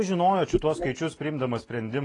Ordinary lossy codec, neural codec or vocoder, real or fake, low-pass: AAC, 32 kbps; none; real; 10.8 kHz